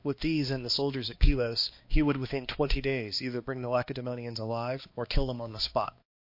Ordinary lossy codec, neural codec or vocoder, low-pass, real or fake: MP3, 32 kbps; codec, 16 kHz, 2 kbps, X-Codec, HuBERT features, trained on balanced general audio; 5.4 kHz; fake